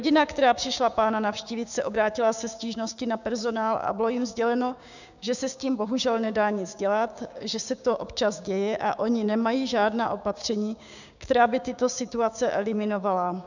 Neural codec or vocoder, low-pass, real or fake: codec, 16 kHz, 6 kbps, DAC; 7.2 kHz; fake